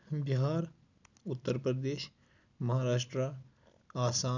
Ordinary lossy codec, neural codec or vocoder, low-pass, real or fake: none; none; 7.2 kHz; real